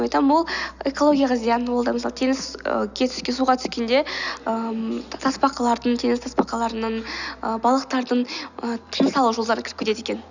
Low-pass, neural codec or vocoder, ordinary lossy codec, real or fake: 7.2 kHz; none; none; real